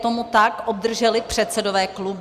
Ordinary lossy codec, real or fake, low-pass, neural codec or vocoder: Opus, 64 kbps; real; 14.4 kHz; none